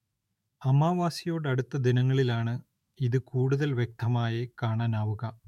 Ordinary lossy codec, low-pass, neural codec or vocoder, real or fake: MP3, 64 kbps; 19.8 kHz; autoencoder, 48 kHz, 128 numbers a frame, DAC-VAE, trained on Japanese speech; fake